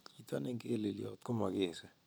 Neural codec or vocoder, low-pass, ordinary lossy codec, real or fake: none; none; none; real